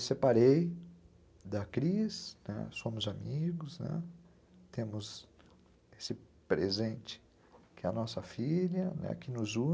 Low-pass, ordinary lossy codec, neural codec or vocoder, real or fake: none; none; none; real